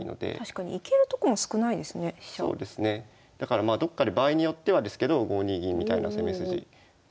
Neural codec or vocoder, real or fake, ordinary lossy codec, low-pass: none; real; none; none